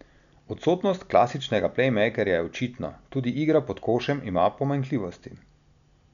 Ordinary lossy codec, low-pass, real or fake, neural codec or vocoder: none; 7.2 kHz; real; none